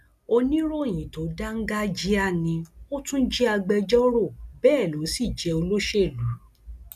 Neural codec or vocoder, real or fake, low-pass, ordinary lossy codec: none; real; 14.4 kHz; none